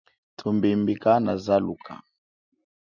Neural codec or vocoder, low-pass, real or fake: none; 7.2 kHz; real